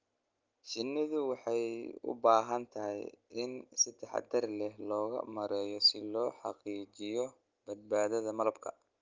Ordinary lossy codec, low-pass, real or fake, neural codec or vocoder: Opus, 32 kbps; 7.2 kHz; real; none